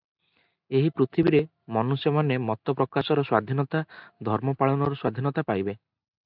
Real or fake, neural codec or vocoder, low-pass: real; none; 5.4 kHz